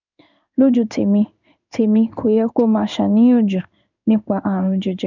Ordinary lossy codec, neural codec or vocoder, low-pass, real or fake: none; codec, 16 kHz in and 24 kHz out, 1 kbps, XY-Tokenizer; 7.2 kHz; fake